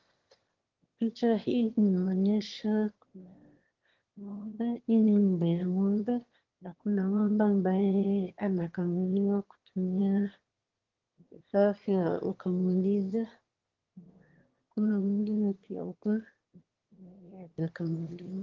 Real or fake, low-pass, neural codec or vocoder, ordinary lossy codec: fake; 7.2 kHz; autoencoder, 22.05 kHz, a latent of 192 numbers a frame, VITS, trained on one speaker; Opus, 16 kbps